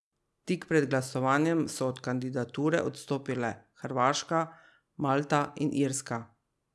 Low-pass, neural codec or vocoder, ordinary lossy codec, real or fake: none; none; none; real